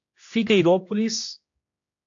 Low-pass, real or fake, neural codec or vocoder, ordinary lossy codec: 7.2 kHz; fake; codec, 16 kHz, 1 kbps, X-Codec, HuBERT features, trained on general audio; AAC, 48 kbps